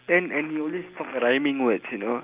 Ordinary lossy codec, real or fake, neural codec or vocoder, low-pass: Opus, 32 kbps; real; none; 3.6 kHz